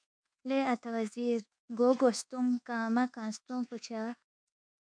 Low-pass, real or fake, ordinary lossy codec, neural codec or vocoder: 9.9 kHz; fake; MP3, 64 kbps; codec, 24 kHz, 1.2 kbps, DualCodec